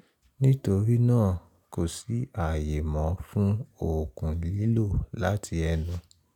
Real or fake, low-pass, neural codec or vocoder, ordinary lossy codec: real; 19.8 kHz; none; none